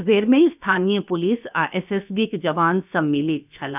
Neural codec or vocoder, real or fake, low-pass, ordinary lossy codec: codec, 16 kHz, about 1 kbps, DyCAST, with the encoder's durations; fake; 3.6 kHz; none